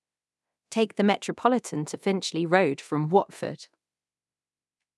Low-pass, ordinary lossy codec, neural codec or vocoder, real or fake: none; none; codec, 24 kHz, 0.9 kbps, DualCodec; fake